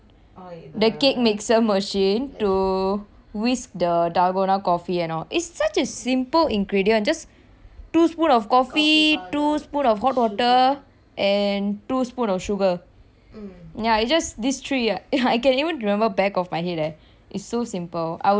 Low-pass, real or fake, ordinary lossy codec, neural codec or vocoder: none; real; none; none